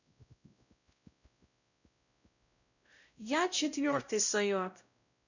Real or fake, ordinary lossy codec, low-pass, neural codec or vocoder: fake; none; 7.2 kHz; codec, 16 kHz, 0.5 kbps, X-Codec, WavLM features, trained on Multilingual LibriSpeech